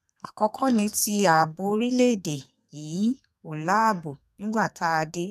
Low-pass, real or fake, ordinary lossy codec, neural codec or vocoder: 14.4 kHz; fake; none; codec, 32 kHz, 1.9 kbps, SNAC